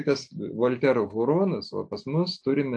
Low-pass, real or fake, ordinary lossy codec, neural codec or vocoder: 9.9 kHz; real; AAC, 64 kbps; none